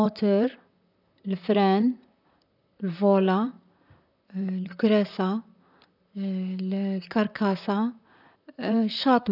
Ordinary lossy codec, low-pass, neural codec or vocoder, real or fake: none; 5.4 kHz; vocoder, 44.1 kHz, 128 mel bands, Pupu-Vocoder; fake